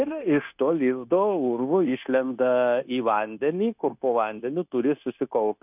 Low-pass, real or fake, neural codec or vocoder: 3.6 kHz; fake; codec, 16 kHz, 0.9 kbps, LongCat-Audio-Codec